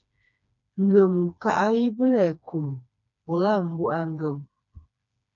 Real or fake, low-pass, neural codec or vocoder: fake; 7.2 kHz; codec, 16 kHz, 2 kbps, FreqCodec, smaller model